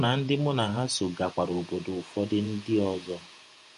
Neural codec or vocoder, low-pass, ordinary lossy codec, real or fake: vocoder, 48 kHz, 128 mel bands, Vocos; 14.4 kHz; MP3, 48 kbps; fake